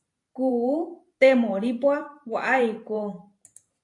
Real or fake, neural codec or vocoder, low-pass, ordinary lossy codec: fake; vocoder, 44.1 kHz, 128 mel bands every 512 samples, BigVGAN v2; 10.8 kHz; AAC, 48 kbps